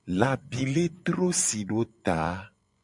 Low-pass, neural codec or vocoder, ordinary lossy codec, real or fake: 10.8 kHz; vocoder, 24 kHz, 100 mel bands, Vocos; MP3, 96 kbps; fake